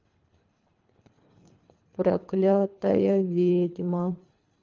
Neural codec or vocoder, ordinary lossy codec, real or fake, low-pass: codec, 24 kHz, 3 kbps, HILCodec; Opus, 32 kbps; fake; 7.2 kHz